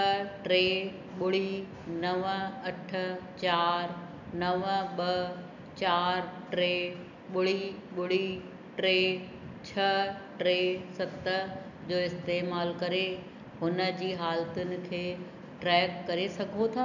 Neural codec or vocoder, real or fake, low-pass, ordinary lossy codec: none; real; 7.2 kHz; none